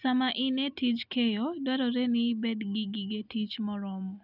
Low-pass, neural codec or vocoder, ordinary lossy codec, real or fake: 5.4 kHz; none; none; real